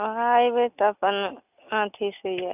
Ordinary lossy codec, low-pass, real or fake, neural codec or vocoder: none; 3.6 kHz; real; none